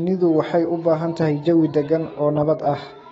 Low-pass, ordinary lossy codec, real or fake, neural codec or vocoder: 19.8 kHz; AAC, 24 kbps; fake; autoencoder, 48 kHz, 128 numbers a frame, DAC-VAE, trained on Japanese speech